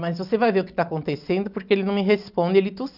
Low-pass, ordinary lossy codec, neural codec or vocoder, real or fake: 5.4 kHz; none; none; real